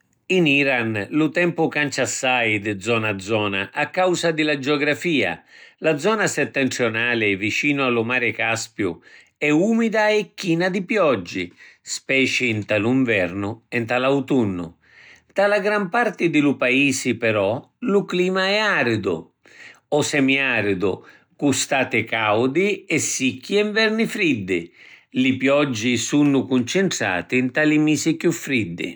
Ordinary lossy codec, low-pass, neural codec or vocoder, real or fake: none; none; none; real